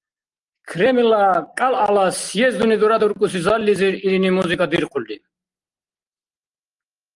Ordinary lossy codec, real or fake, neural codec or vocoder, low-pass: Opus, 24 kbps; real; none; 10.8 kHz